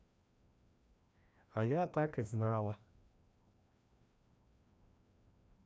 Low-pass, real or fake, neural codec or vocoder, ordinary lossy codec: none; fake; codec, 16 kHz, 1 kbps, FreqCodec, larger model; none